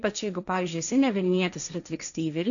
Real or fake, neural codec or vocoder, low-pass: fake; codec, 16 kHz, 1.1 kbps, Voila-Tokenizer; 7.2 kHz